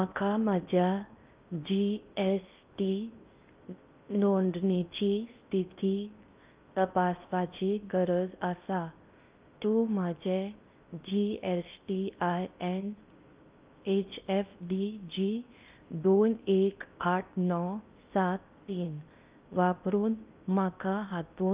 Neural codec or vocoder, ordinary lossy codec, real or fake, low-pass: codec, 16 kHz in and 24 kHz out, 0.6 kbps, FocalCodec, streaming, 4096 codes; Opus, 24 kbps; fake; 3.6 kHz